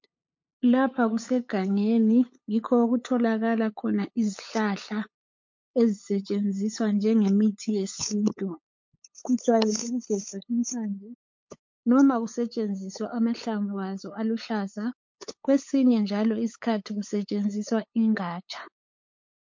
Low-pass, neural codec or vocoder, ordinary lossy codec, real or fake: 7.2 kHz; codec, 16 kHz, 8 kbps, FunCodec, trained on LibriTTS, 25 frames a second; MP3, 48 kbps; fake